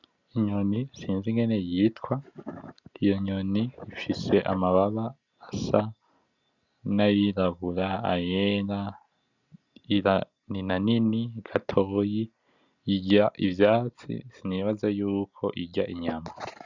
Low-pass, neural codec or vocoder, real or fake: 7.2 kHz; none; real